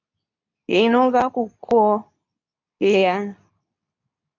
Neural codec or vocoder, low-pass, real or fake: codec, 24 kHz, 0.9 kbps, WavTokenizer, medium speech release version 2; 7.2 kHz; fake